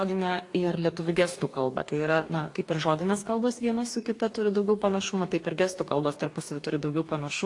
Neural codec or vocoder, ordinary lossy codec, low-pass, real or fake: codec, 44.1 kHz, 2.6 kbps, DAC; AAC, 48 kbps; 10.8 kHz; fake